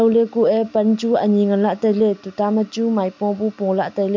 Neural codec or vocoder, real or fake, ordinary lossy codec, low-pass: none; real; MP3, 64 kbps; 7.2 kHz